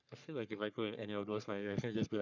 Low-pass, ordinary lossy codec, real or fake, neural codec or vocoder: 7.2 kHz; none; fake; codec, 44.1 kHz, 3.4 kbps, Pupu-Codec